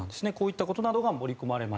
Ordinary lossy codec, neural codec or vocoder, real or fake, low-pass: none; none; real; none